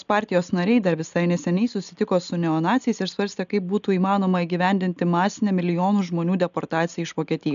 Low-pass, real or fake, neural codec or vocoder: 7.2 kHz; real; none